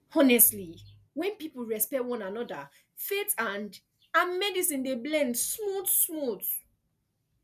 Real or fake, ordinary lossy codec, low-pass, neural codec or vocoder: real; none; 14.4 kHz; none